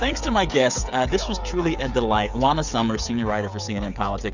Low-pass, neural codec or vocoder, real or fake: 7.2 kHz; codec, 16 kHz, 16 kbps, FreqCodec, smaller model; fake